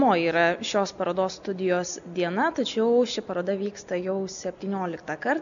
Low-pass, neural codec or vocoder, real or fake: 7.2 kHz; none; real